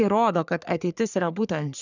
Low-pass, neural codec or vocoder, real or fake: 7.2 kHz; codec, 44.1 kHz, 3.4 kbps, Pupu-Codec; fake